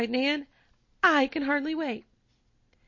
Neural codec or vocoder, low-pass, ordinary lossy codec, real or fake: none; 7.2 kHz; MP3, 32 kbps; real